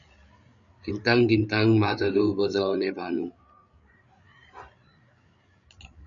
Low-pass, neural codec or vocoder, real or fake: 7.2 kHz; codec, 16 kHz, 8 kbps, FreqCodec, larger model; fake